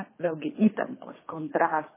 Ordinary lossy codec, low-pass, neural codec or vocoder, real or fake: MP3, 16 kbps; 3.6 kHz; codec, 24 kHz, 3 kbps, HILCodec; fake